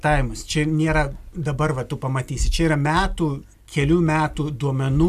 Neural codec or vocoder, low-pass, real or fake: none; 14.4 kHz; real